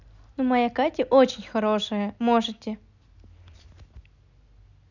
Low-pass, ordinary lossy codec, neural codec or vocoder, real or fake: 7.2 kHz; none; none; real